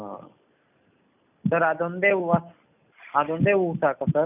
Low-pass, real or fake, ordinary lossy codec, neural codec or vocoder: 3.6 kHz; real; none; none